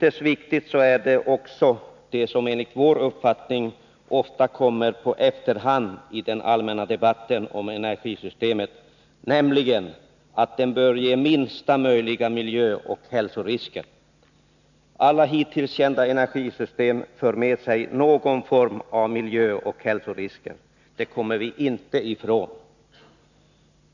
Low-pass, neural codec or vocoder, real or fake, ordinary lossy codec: 7.2 kHz; none; real; none